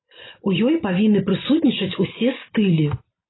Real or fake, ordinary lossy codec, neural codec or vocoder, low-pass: real; AAC, 16 kbps; none; 7.2 kHz